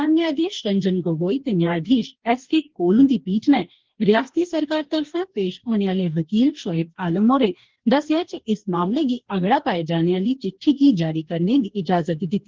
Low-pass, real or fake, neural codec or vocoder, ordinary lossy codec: 7.2 kHz; fake; codec, 44.1 kHz, 2.6 kbps, DAC; Opus, 16 kbps